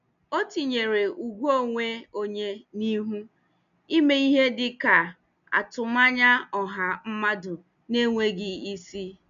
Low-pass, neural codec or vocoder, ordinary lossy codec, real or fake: 7.2 kHz; none; none; real